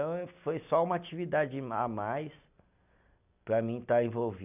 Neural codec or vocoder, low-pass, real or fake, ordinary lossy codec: none; 3.6 kHz; real; none